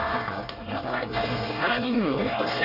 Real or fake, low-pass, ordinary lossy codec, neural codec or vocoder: fake; 5.4 kHz; none; codec, 24 kHz, 1 kbps, SNAC